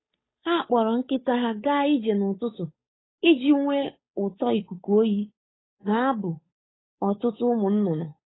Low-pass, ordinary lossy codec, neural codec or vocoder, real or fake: 7.2 kHz; AAC, 16 kbps; codec, 16 kHz, 8 kbps, FunCodec, trained on Chinese and English, 25 frames a second; fake